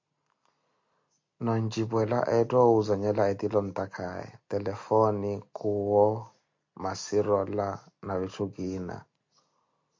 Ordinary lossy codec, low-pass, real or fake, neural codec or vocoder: MP3, 48 kbps; 7.2 kHz; real; none